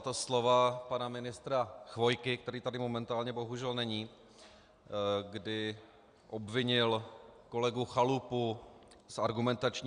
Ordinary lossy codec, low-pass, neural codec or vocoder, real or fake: Opus, 64 kbps; 9.9 kHz; none; real